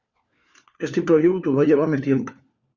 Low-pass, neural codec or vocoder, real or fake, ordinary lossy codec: 7.2 kHz; codec, 16 kHz, 4 kbps, FunCodec, trained on LibriTTS, 50 frames a second; fake; Opus, 64 kbps